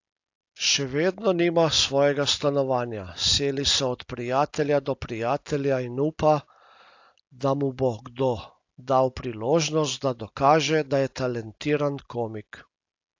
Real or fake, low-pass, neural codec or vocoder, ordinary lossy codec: real; 7.2 kHz; none; AAC, 48 kbps